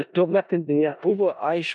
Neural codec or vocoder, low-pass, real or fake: codec, 16 kHz in and 24 kHz out, 0.4 kbps, LongCat-Audio-Codec, four codebook decoder; 10.8 kHz; fake